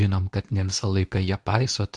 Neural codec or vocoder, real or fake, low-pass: codec, 24 kHz, 0.9 kbps, WavTokenizer, medium speech release version 2; fake; 10.8 kHz